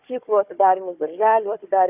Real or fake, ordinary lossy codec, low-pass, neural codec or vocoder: fake; Opus, 64 kbps; 3.6 kHz; codec, 16 kHz, 4 kbps, FunCodec, trained on Chinese and English, 50 frames a second